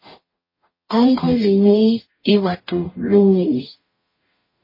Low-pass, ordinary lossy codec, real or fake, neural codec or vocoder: 5.4 kHz; MP3, 24 kbps; fake; codec, 44.1 kHz, 0.9 kbps, DAC